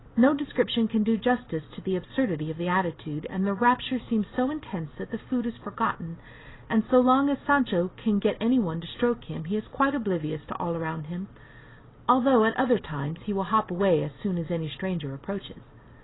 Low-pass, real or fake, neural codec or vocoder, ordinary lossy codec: 7.2 kHz; real; none; AAC, 16 kbps